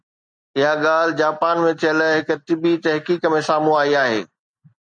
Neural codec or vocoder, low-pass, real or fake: none; 9.9 kHz; real